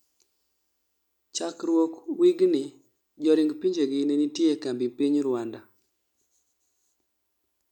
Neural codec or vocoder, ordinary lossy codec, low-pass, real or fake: none; none; 19.8 kHz; real